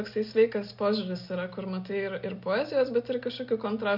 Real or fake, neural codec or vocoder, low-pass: real; none; 5.4 kHz